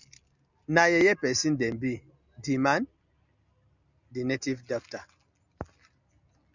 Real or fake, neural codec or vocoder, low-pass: real; none; 7.2 kHz